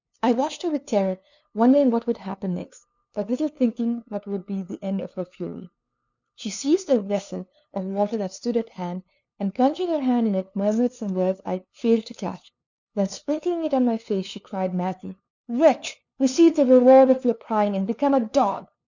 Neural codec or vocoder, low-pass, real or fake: codec, 16 kHz, 2 kbps, FunCodec, trained on LibriTTS, 25 frames a second; 7.2 kHz; fake